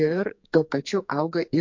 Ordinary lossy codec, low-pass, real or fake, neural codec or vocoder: MP3, 48 kbps; 7.2 kHz; fake; codec, 44.1 kHz, 2.6 kbps, SNAC